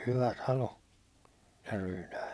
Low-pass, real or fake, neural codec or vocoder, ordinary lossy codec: none; fake; vocoder, 22.05 kHz, 80 mel bands, WaveNeXt; none